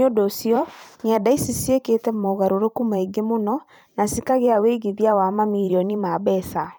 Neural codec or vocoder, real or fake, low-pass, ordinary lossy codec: vocoder, 44.1 kHz, 128 mel bands every 512 samples, BigVGAN v2; fake; none; none